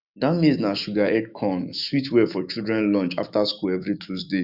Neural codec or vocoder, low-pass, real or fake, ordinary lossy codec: autoencoder, 48 kHz, 128 numbers a frame, DAC-VAE, trained on Japanese speech; 5.4 kHz; fake; none